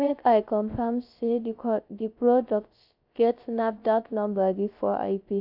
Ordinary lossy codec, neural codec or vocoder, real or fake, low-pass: AAC, 48 kbps; codec, 16 kHz, 0.3 kbps, FocalCodec; fake; 5.4 kHz